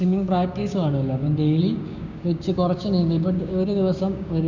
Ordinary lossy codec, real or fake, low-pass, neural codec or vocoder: none; fake; 7.2 kHz; codec, 16 kHz, 6 kbps, DAC